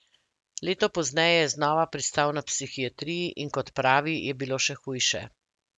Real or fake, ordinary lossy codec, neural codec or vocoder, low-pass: real; none; none; none